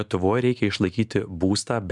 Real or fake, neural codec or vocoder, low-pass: real; none; 10.8 kHz